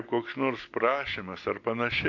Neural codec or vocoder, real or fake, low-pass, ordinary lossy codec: none; real; 7.2 kHz; AAC, 48 kbps